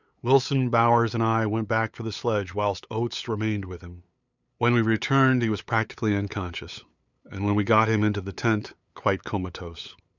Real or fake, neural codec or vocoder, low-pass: fake; codec, 16 kHz, 8 kbps, FunCodec, trained on LibriTTS, 25 frames a second; 7.2 kHz